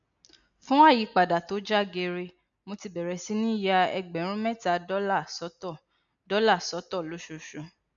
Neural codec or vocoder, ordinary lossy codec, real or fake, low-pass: none; none; real; 7.2 kHz